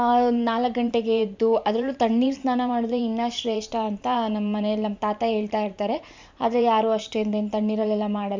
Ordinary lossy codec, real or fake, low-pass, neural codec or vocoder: MP3, 64 kbps; fake; 7.2 kHz; vocoder, 44.1 kHz, 128 mel bands every 512 samples, BigVGAN v2